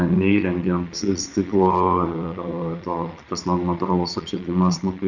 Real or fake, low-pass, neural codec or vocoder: fake; 7.2 kHz; vocoder, 22.05 kHz, 80 mel bands, Vocos